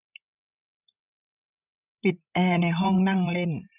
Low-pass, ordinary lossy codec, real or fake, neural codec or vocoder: 3.6 kHz; none; fake; codec, 16 kHz, 16 kbps, FreqCodec, larger model